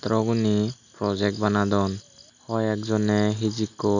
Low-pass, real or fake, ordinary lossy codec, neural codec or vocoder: 7.2 kHz; real; none; none